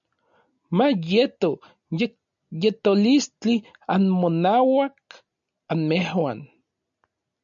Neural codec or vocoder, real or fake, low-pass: none; real; 7.2 kHz